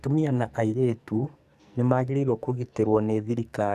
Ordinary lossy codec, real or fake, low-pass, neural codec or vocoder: none; fake; 14.4 kHz; codec, 44.1 kHz, 2.6 kbps, SNAC